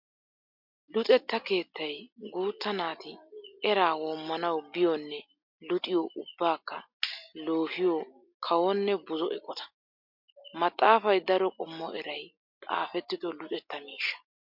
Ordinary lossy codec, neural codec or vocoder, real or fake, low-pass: MP3, 48 kbps; none; real; 5.4 kHz